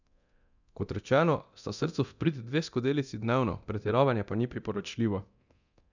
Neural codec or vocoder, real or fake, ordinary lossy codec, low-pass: codec, 24 kHz, 0.9 kbps, DualCodec; fake; none; 7.2 kHz